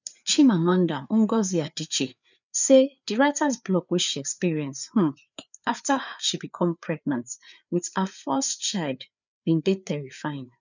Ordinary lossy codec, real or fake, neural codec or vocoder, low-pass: none; fake; codec, 16 kHz, 4 kbps, FreqCodec, larger model; 7.2 kHz